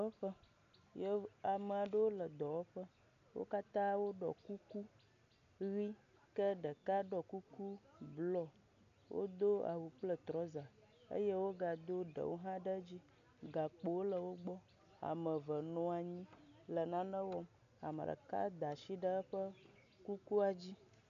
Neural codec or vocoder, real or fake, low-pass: none; real; 7.2 kHz